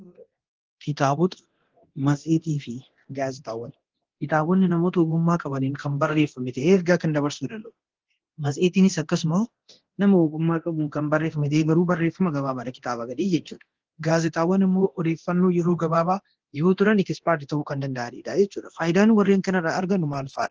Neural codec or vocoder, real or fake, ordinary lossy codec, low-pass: codec, 24 kHz, 0.9 kbps, DualCodec; fake; Opus, 16 kbps; 7.2 kHz